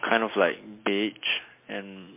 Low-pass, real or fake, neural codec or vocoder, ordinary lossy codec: 3.6 kHz; real; none; MP3, 24 kbps